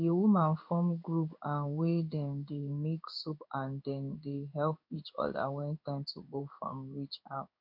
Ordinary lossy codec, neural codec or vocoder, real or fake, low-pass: none; codec, 16 kHz in and 24 kHz out, 1 kbps, XY-Tokenizer; fake; 5.4 kHz